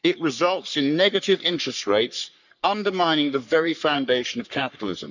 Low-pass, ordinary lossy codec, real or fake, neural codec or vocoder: 7.2 kHz; none; fake; codec, 44.1 kHz, 3.4 kbps, Pupu-Codec